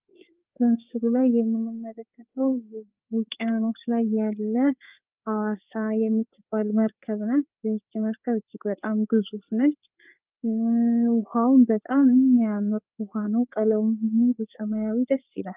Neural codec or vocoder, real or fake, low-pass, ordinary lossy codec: codec, 16 kHz, 4 kbps, FreqCodec, larger model; fake; 3.6 kHz; Opus, 32 kbps